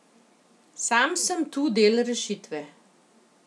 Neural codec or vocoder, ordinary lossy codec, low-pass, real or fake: none; none; none; real